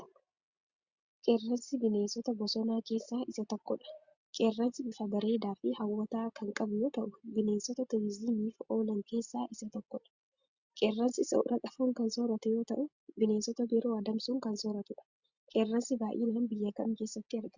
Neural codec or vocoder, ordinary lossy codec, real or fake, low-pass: none; Opus, 64 kbps; real; 7.2 kHz